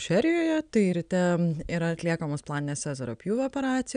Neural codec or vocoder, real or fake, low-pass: none; real; 9.9 kHz